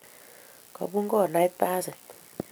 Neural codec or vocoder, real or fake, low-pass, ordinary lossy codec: none; real; none; none